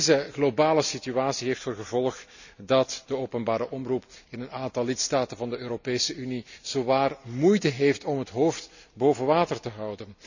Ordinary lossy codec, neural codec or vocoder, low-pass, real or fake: none; none; 7.2 kHz; real